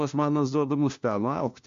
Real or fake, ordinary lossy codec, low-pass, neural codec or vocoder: fake; AAC, 64 kbps; 7.2 kHz; codec, 16 kHz, 1 kbps, FunCodec, trained on LibriTTS, 50 frames a second